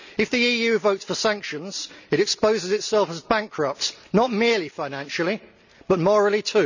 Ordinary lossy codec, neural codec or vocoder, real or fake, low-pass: none; none; real; 7.2 kHz